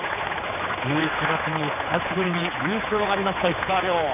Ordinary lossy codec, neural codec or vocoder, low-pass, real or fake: none; codec, 16 kHz, 4 kbps, X-Codec, HuBERT features, trained on general audio; 3.6 kHz; fake